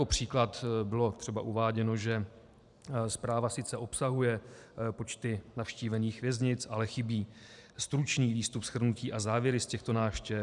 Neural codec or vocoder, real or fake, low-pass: vocoder, 44.1 kHz, 128 mel bands every 512 samples, BigVGAN v2; fake; 10.8 kHz